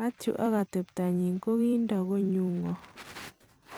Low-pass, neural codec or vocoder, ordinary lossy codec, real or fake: none; vocoder, 44.1 kHz, 128 mel bands every 256 samples, BigVGAN v2; none; fake